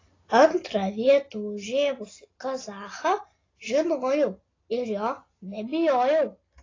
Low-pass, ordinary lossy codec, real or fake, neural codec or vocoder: 7.2 kHz; AAC, 32 kbps; real; none